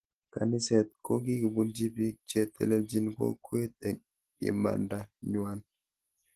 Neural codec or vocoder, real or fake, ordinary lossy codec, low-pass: none; real; Opus, 16 kbps; 14.4 kHz